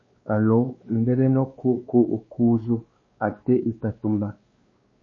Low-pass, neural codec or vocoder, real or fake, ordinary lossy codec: 7.2 kHz; codec, 16 kHz, 2 kbps, X-Codec, WavLM features, trained on Multilingual LibriSpeech; fake; MP3, 32 kbps